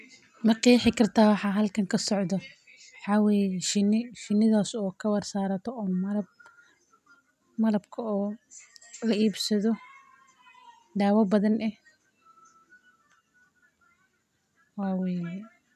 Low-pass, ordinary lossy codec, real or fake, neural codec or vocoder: 14.4 kHz; none; real; none